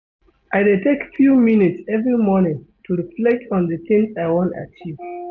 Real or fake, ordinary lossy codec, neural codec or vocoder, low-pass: real; none; none; 7.2 kHz